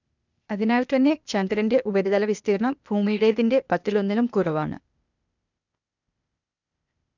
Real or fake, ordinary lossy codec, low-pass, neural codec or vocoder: fake; none; 7.2 kHz; codec, 16 kHz, 0.8 kbps, ZipCodec